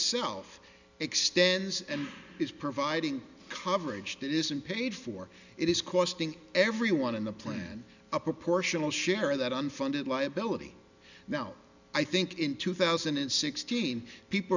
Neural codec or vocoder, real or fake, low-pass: none; real; 7.2 kHz